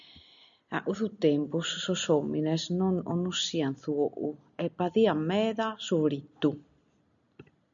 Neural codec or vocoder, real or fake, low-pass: none; real; 7.2 kHz